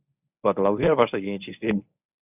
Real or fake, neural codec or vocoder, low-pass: fake; codec, 24 kHz, 0.9 kbps, WavTokenizer, medium speech release version 1; 3.6 kHz